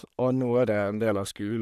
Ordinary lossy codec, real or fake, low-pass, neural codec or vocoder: none; fake; 14.4 kHz; codec, 44.1 kHz, 3.4 kbps, Pupu-Codec